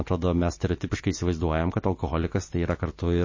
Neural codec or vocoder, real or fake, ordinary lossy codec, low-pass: none; real; MP3, 32 kbps; 7.2 kHz